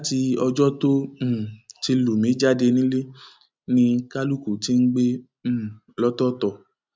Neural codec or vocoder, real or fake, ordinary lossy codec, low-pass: none; real; none; none